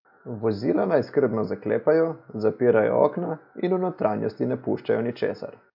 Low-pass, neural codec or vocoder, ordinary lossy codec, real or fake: 5.4 kHz; none; AAC, 48 kbps; real